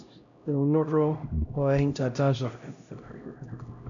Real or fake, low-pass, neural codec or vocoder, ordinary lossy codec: fake; 7.2 kHz; codec, 16 kHz, 0.5 kbps, X-Codec, HuBERT features, trained on LibriSpeech; none